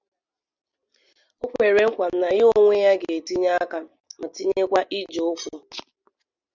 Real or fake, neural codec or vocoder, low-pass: real; none; 7.2 kHz